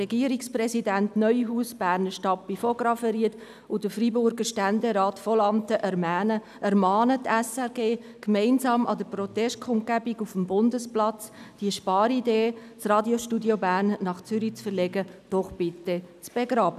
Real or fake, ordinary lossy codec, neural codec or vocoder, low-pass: real; none; none; 14.4 kHz